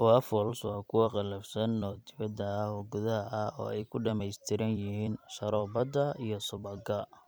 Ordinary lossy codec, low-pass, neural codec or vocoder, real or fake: none; none; vocoder, 44.1 kHz, 128 mel bands every 256 samples, BigVGAN v2; fake